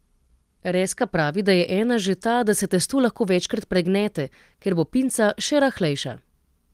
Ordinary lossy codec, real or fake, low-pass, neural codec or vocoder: Opus, 24 kbps; real; 14.4 kHz; none